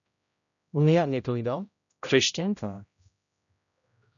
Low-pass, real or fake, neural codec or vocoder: 7.2 kHz; fake; codec, 16 kHz, 0.5 kbps, X-Codec, HuBERT features, trained on general audio